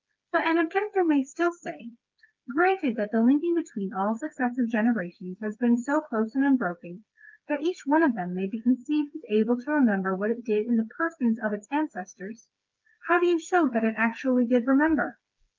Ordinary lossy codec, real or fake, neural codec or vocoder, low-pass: Opus, 24 kbps; fake; codec, 16 kHz, 4 kbps, FreqCodec, smaller model; 7.2 kHz